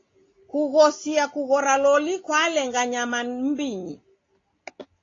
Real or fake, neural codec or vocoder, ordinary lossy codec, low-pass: real; none; AAC, 32 kbps; 7.2 kHz